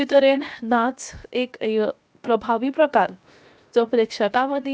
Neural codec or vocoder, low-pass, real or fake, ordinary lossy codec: codec, 16 kHz, 0.7 kbps, FocalCodec; none; fake; none